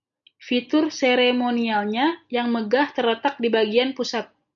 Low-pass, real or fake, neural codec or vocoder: 7.2 kHz; real; none